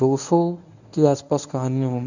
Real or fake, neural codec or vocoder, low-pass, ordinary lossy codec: fake; codec, 24 kHz, 0.9 kbps, WavTokenizer, medium speech release version 1; 7.2 kHz; none